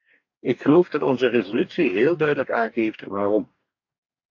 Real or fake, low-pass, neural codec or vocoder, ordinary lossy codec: fake; 7.2 kHz; codec, 44.1 kHz, 2.6 kbps, DAC; AAC, 48 kbps